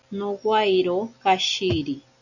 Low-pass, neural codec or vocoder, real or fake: 7.2 kHz; none; real